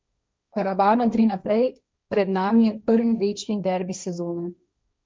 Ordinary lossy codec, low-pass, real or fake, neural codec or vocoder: none; none; fake; codec, 16 kHz, 1.1 kbps, Voila-Tokenizer